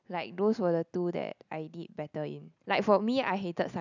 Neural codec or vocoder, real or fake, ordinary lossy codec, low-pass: none; real; none; 7.2 kHz